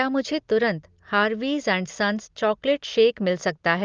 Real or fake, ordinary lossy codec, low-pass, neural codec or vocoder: real; Opus, 32 kbps; 7.2 kHz; none